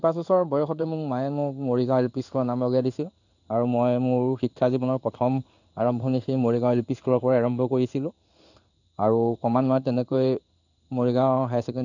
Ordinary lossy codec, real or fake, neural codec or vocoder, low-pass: none; fake; codec, 16 kHz in and 24 kHz out, 1 kbps, XY-Tokenizer; 7.2 kHz